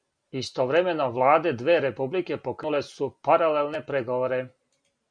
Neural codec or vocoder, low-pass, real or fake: none; 9.9 kHz; real